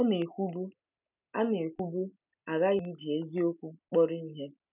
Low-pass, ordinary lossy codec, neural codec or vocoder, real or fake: 3.6 kHz; none; none; real